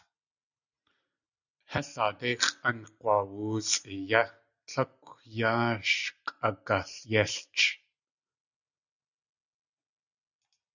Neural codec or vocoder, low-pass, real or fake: none; 7.2 kHz; real